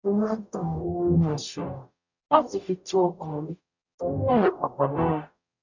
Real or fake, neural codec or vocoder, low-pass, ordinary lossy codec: fake; codec, 44.1 kHz, 0.9 kbps, DAC; 7.2 kHz; none